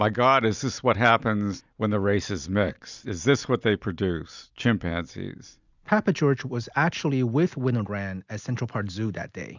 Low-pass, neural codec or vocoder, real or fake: 7.2 kHz; none; real